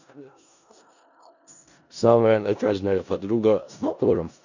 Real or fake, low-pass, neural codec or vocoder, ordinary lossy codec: fake; 7.2 kHz; codec, 16 kHz in and 24 kHz out, 0.4 kbps, LongCat-Audio-Codec, four codebook decoder; none